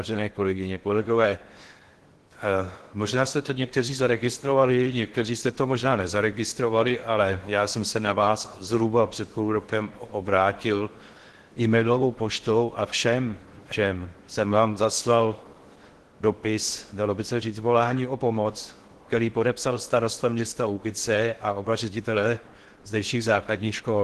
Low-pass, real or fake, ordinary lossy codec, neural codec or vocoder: 10.8 kHz; fake; Opus, 16 kbps; codec, 16 kHz in and 24 kHz out, 0.6 kbps, FocalCodec, streaming, 4096 codes